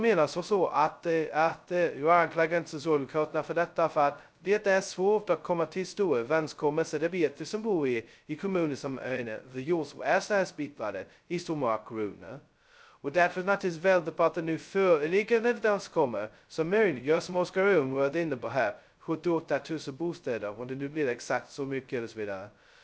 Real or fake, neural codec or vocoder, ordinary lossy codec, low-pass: fake; codec, 16 kHz, 0.2 kbps, FocalCodec; none; none